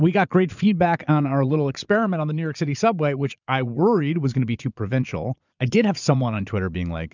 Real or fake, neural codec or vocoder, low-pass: fake; vocoder, 22.05 kHz, 80 mel bands, Vocos; 7.2 kHz